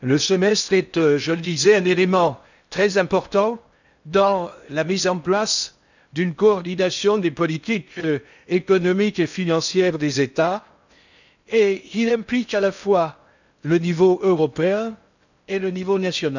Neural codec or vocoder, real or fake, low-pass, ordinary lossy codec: codec, 16 kHz in and 24 kHz out, 0.6 kbps, FocalCodec, streaming, 4096 codes; fake; 7.2 kHz; none